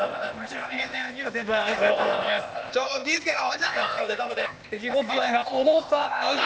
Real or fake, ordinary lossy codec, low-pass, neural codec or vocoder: fake; none; none; codec, 16 kHz, 0.8 kbps, ZipCodec